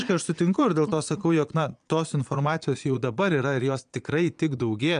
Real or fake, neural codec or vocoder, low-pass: fake; vocoder, 22.05 kHz, 80 mel bands, Vocos; 9.9 kHz